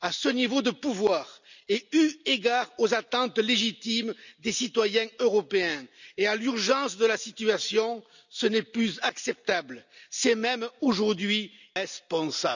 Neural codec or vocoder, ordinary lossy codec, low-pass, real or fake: none; none; 7.2 kHz; real